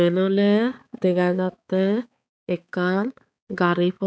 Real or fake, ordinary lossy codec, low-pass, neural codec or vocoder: fake; none; none; codec, 16 kHz, 4 kbps, X-Codec, HuBERT features, trained on balanced general audio